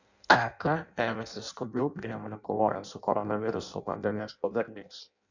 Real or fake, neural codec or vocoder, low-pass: fake; codec, 16 kHz in and 24 kHz out, 0.6 kbps, FireRedTTS-2 codec; 7.2 kHz